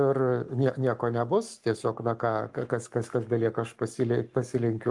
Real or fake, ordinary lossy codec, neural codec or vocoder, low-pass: fake; Opus, 32 kbps; codec, 44.1 kHz, 7.8 kbps, Pupu-Codec; 10.8 kHz